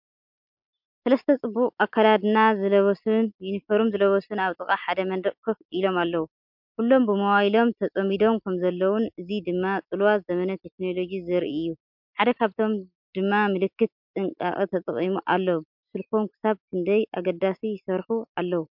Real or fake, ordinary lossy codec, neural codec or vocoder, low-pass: real; MP3, 48 kbps; none; 5.4 kHz